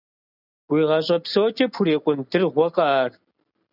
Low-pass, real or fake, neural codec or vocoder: 5.4 kHz; real; none